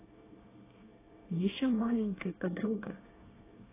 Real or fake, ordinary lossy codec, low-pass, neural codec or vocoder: fake; AAC, 16 kbps; 3.6 kHz; codec, 24 kHz, 1 kbps, SNAC